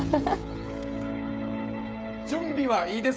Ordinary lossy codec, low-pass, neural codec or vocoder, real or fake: none; none; codec, 16 kHz, 16 kbps, FreqCodec, smaller model; fake